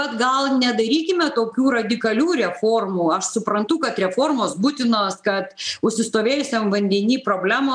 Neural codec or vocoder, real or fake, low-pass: none; real; 9.9 kHz